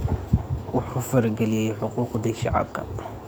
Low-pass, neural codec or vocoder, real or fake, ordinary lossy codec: none; codec, 44.1 kHz, 7.8 kbps, DAC; fake; none